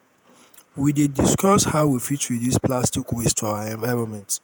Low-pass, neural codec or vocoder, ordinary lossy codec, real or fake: none; vocoder, 48 kHz, 128 mel bands, Vocos; none; fake